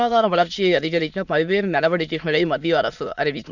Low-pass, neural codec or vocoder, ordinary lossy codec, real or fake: 7.2 kHz; autoencoder, 22.05 kHz, a latent of 192 numbers a frame, VITS, trained on many speakers; none; fake